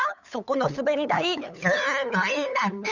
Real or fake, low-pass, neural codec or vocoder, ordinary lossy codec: fake; 7.2 kHz; codec, 16 kHz, 8 kbps, FunCodec, trained on LibriTTS, 25 frames a second; none